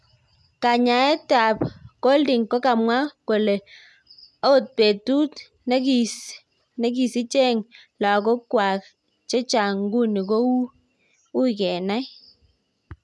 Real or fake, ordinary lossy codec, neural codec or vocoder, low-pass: real; none; none; none